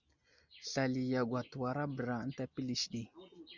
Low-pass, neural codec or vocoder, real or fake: 7.2 kHz; none; real